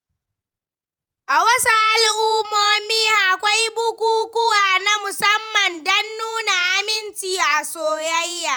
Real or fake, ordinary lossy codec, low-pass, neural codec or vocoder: fake; none; none; vocoder, 48 kHz, 128 mel bands, Vocos